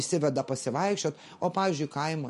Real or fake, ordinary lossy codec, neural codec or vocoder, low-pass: real; MP3, 48 kbps; none; 14.4 kHz